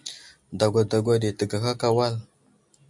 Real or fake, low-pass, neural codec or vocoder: real; 10.8 kHz; none